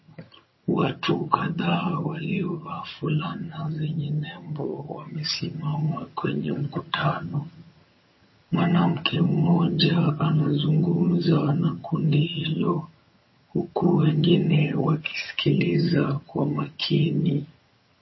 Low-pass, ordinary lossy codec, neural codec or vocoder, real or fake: 7.2 kHz; MP3, 24 kbps; vocoder, 22.05 kHz, 80 mel bands, HiFi-GAN; fake